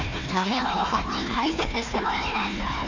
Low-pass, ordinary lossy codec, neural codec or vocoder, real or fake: 7.2 kHz; none; codec, 16 kHz, 1 kbps, FunCodec, trained on Chinese and English, 50 frames a second; fake